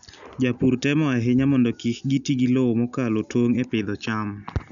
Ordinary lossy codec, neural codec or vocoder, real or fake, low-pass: none; none; real; 7.2 kHz